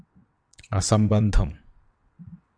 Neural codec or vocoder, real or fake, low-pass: vocoder, 22.05 kHz, 80 mel bands, WaveNeXt; fake; 9.9 kHz